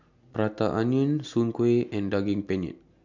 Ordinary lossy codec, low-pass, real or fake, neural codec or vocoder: none; 7.2 kHz; real; none